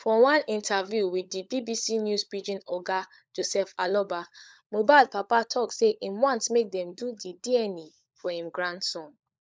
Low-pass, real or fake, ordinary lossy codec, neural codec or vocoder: none; fake; none; codec, 16 kHz, 16 kbps, FunCodec, trained on LibriTTS, 50 frames a second